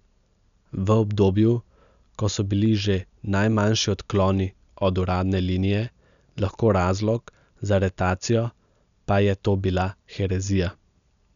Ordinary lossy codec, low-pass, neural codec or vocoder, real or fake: none; 7.2 kHz; none; real